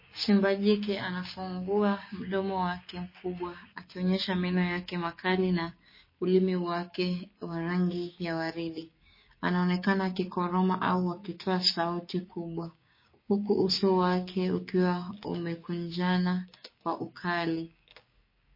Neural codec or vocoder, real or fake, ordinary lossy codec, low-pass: codec, 16 kHz, 6 kbps, DAC; fake; MP3, 24 kbps; 5.4 kHz